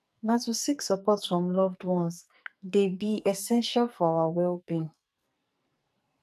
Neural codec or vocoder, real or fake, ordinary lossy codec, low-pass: codec, 44.1 kHz, 2.6 kbps, SNAC; fake; none; 14.4 kHz